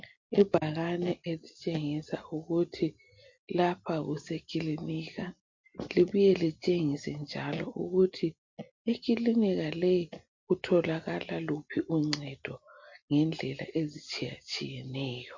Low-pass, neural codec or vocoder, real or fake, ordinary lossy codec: 7.2 kHz; none; real; MP3, 48 kbps